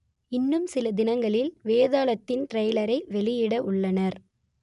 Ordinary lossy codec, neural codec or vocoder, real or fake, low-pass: none; none; real; 10.8 kHz